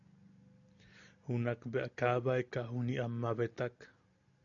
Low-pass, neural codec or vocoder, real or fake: 7.2 kHz; none; real